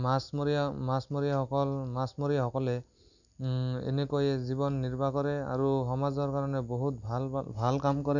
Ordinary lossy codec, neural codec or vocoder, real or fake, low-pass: none; none; real; 7.2 kHz